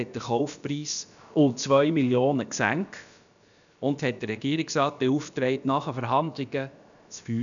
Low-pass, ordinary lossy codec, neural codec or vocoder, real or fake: 7.2 kHz; none; codec, 16 kHz, about 1 kbps, DyCAST, with the encoder's durations; fake